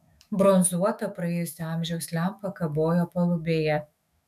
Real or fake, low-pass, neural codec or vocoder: fake; 14.4 kHz; autoencoder, 48 kHz, 128 numbers a frame, DAC-VAE, trained on Japanese speech